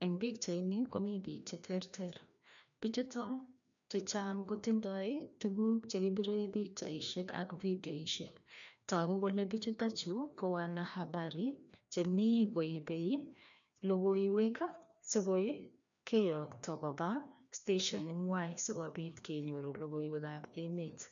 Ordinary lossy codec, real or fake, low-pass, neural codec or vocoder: none; fake; 7.2 kHz; codec, 16 kHz, 1 kbps, FreqCodec, larger model